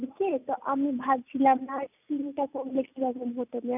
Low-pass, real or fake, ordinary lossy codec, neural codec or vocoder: 3.6 kHz; real; none; none